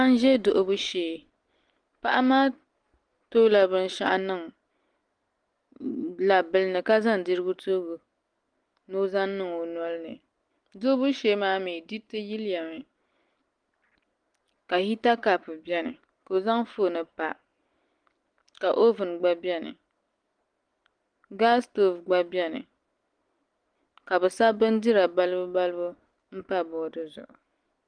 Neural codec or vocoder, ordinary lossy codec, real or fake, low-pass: none; Opus, 24 kbps; real; 9.9 kHz